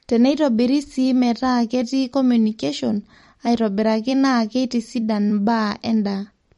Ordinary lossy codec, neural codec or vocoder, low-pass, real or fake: MP3, 48 kbps; vocoder, 44.1 kHz, 128 mel bands every 256 samples, BigVGAN v2; 19.8 kHz; fake